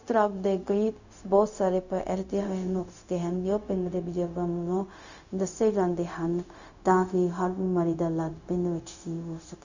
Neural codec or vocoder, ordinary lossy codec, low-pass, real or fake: codec, 16 kHz, 0.4 kbps, LongCat-Audio-Codec; none; 7.2 kHz; fake